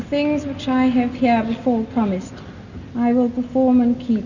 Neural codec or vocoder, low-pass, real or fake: none; 7.2 kHz; real